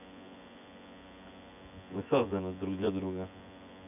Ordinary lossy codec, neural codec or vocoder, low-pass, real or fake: none; vocoder, 24 kHz, 100 mel bands, Vocos; 3.6 kHz; fake